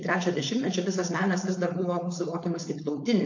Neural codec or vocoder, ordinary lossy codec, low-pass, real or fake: codec, 16 kHz, 4.8 kbps, FACodec; AAC, 48 kbps; 7.2 kHz; fake